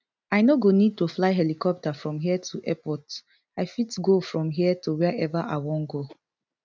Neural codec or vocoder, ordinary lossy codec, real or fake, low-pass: none; none; real; none